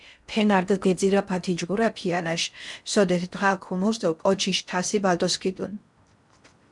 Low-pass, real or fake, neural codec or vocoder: 10.8 kHz; fake; codec, 16 kHz in and 24 kHz out, 0.6 kbps, FocalCodec, streaming, 4096 codes